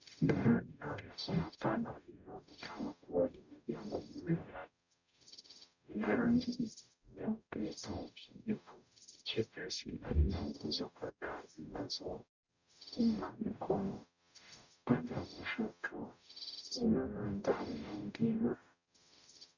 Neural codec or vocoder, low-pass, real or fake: codec, 44.1 kHz, 0.9 kbps, DAC; 7.2 kHz; fake